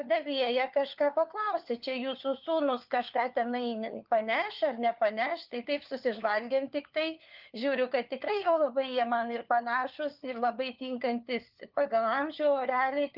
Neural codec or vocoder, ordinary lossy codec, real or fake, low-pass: codec, 16 kHz, 4 kbps, FunCodec, trained on LibriTTS, 50 frames a second; Opus, 24 kbps; fake; 5.4 kHz